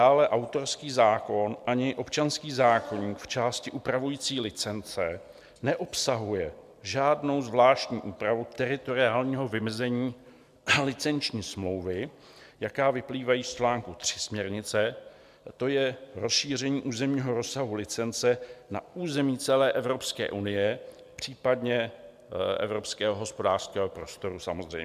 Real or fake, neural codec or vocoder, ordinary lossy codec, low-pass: real; none; MP3, 96 kbps; 14.4 kHz